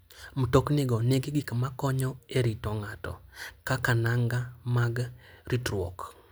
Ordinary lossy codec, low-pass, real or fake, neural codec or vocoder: none; none; real; none